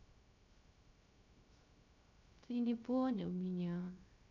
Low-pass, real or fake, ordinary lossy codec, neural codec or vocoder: 7.2 kHz; fake; none; codec, 16 kHz, 0.3 kbps, FocalCodec